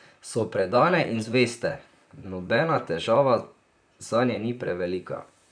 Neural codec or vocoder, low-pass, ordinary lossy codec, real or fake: vocoder, 44.1 kHz, 128 mel bands, Pupu-Vocoder; 9.9 kHz; none; fake